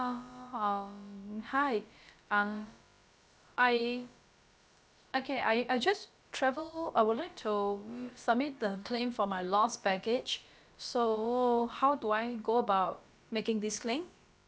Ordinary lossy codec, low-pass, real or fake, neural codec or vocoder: none; none; fake; codec, 16 kHz, about 1 kbps, DyCAST, with the encoder's durations